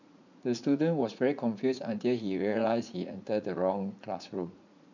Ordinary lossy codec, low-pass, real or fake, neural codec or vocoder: none; 7.2 kHz; fake; vocoder, 44.1 kHz, 80 mel bands, Vocos